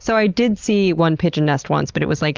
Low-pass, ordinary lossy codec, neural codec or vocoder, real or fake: 7.2 kHz; Opus, 32 kbps; none; real